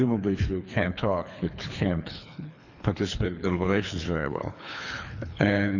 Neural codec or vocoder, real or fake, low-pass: codec, 24 kHz, 3 kbps, HILCodec; fake; 7.2 kHz